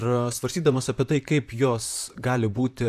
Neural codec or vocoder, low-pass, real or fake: vocoder, 44.1 kHz, 128 mel bands, Pupu-Vocoder; 14.4 kHz; fake